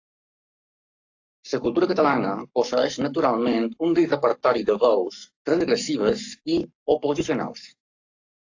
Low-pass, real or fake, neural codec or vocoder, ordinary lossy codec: 7.2 kHz; fake; codec, 44.1 kHz, 7.8 kbps, Pupu-Codec; AAC, 48 kbps